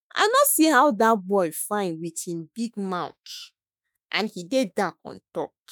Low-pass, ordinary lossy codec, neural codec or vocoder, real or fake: none; none; autoencoder, 48 kHz, 32 numbers a frame, DAC-VAE, trained on Japanese speech; fake